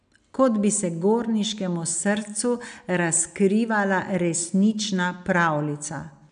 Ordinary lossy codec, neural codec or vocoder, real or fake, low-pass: none; none; real; 9.9 kHz